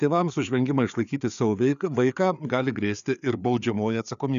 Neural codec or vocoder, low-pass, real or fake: codec, 16 kHz, 4 kbps, FreqCodec, larger model; 7.2 kHz; fake